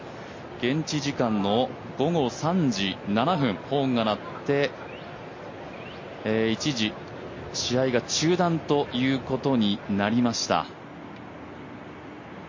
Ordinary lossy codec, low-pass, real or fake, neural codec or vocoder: MP3, 32 kbps; 7.2 kHz; real; none